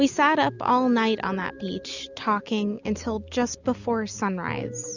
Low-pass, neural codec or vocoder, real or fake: 7.2 kHz; none; real